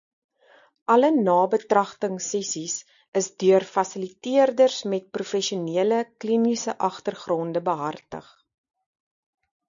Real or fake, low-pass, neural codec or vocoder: real; 7.2 kHz; none